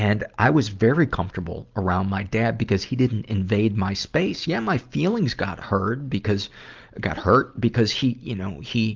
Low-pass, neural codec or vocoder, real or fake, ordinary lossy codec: 7.2 kHz; none; real; Opus, 24 kbps